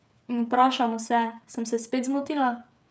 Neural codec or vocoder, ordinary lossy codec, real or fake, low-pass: codec, 16 kHz, 8 kbps, FreqCodec, smaller model; none; fake; none